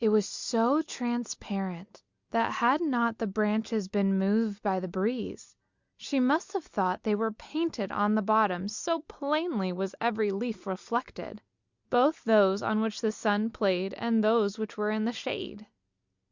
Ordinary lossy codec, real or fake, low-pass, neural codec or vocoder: Opus, 64 kbps; real; 7.2 kHz; none